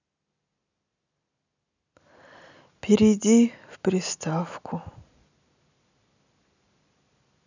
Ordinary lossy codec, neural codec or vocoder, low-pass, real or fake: none; none; 7.2 kHz; real